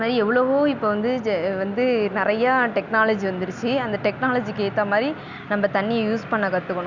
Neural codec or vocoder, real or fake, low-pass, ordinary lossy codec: none; real; 7.2 kHz; Opus, 64 kbps